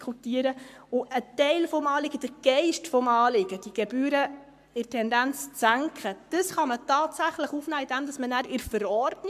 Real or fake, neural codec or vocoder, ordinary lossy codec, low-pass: fake; codec, 44.1 kHz, 7.8 kbps, Pupu-Codec; AAC, 96 kbps; 14.4 kHz